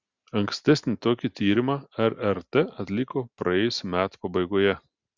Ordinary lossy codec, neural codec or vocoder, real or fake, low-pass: Opus, 64 kbps; none; real; 7.2 kHz